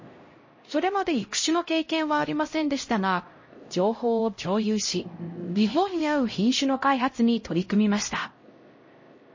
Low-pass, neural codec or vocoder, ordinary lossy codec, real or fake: 7.2 kHz; codec, 16 kHz, 0.5 kbps, X-Codec, HuBERT features, trained on LibriSpeech; MP3, 32 kbps; fake